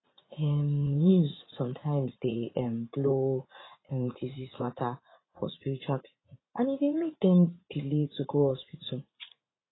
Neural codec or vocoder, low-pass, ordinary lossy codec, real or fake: vocoder, 44.1 kHz, 80 mel bands, Vocos; 7.2 kHz; AAC, 16 kbps; fake